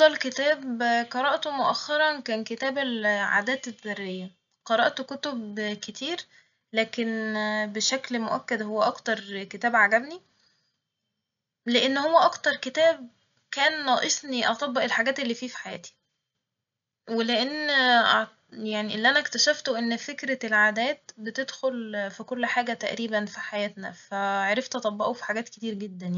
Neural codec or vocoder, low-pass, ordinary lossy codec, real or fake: none; 7.2 kHz; none; real